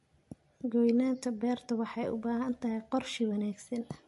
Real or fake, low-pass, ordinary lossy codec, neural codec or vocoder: real; 14.4 kHz; MP3, 48 kbps; none